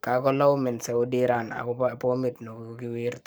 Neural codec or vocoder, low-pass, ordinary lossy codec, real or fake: codec, 44.1 kHz, 7.8 kbps, Pupu-Codec; none; none; fake